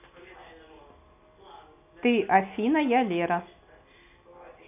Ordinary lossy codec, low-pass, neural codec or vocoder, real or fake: none; 3.6 kHz; none; real